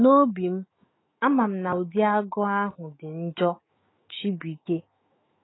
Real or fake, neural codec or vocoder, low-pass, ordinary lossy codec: fake; autoencoder, 48 kHz, 128 numbers a frame, DAC-VAE, trained on Japanese speech; 7.2 kHz; AAC, 16 kbps